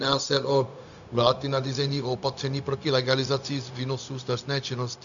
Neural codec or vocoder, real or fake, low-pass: codec, 16 kHz, 0.4 kbps, LongCat-Audio-Codec; fake; 7.2 kHz